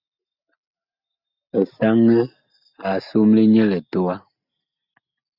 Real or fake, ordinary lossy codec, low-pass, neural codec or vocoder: real; Opus, 64 kbps; 5.4 kHz; none